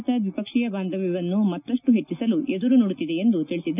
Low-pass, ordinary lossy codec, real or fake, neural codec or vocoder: 3.6 kHz; none; real; none